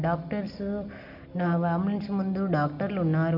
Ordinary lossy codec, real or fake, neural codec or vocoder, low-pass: none; real; none; 5.4 kHz